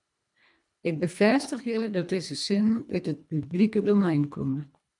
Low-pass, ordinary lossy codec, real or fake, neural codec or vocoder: 10.8 kHz; MP3, 96 kbps; fake; codec, 24 kHz, 1.5 kbps, HILCodec